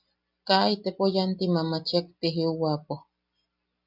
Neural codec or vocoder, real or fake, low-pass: none; real; 5.4 kHz